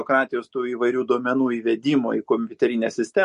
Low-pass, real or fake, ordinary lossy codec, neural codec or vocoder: 14.4 kHz; real; MP3, 48 kbps; none